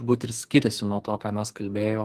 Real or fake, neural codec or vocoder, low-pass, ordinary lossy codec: fake; codec, 44.1 kHz, 2.6 kbps, SNAC; 14.4 kHz; Opus, 24 kbps